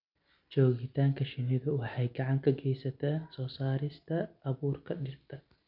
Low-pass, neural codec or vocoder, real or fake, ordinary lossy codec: 5.4 kHz; none; real; none